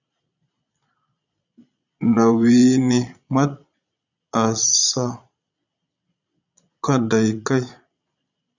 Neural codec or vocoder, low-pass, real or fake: vocoder, 22.05 kHz, 80 mel bands, Vocos; 7.2 kHz; fake